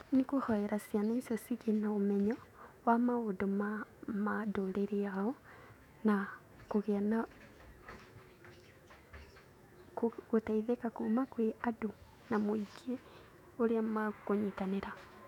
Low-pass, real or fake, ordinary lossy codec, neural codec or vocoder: 19.8 kHz; fake; none; autoencoder, 48 kHz, 128 numbers a frame, DAC-VAE, trained on Japanese speech